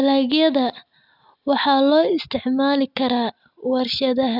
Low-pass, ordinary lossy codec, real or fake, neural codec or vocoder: 5.4 kHz; none; real; none